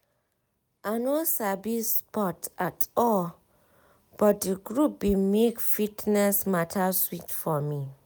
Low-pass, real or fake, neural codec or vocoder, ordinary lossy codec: none; real; none; none